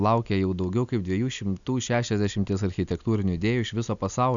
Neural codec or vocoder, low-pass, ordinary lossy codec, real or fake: none; 7.2 kHz; MP3, 96 kbps; real